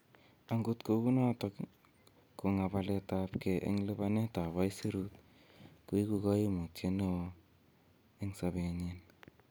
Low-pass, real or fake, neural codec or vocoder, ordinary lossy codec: none; real; none; none